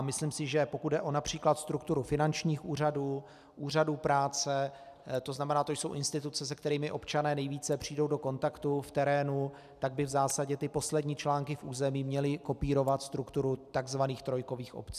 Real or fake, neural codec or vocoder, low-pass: real; none; 14.4 kHz